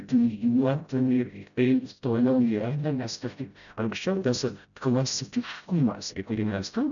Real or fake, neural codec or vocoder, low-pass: fake; codec, 16 kHz, 0.5 kbps, FreqCodec, smaller model; 7.2 kHz